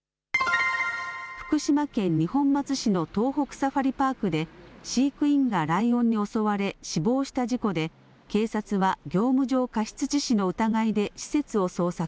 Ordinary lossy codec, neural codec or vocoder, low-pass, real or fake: none; none; none; real